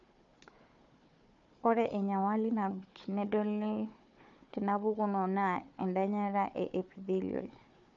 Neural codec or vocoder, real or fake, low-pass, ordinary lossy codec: codec, 16 kHz, 4 kbps, FunCodec, trained on Chinese and English, 50 frames a second; fake; 7.2 kHz; MP3, 64 kbps